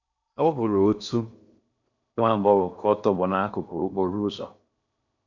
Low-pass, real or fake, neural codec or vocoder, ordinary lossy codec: 7.2 kHz; fake; codec, 16 kHz in and 24 kHz out, 0.6 kbps, FocalCodec, streaming, 2048 codes; none